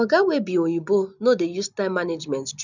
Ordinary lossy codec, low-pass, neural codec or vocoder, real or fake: none; 7.2 kHz; none; real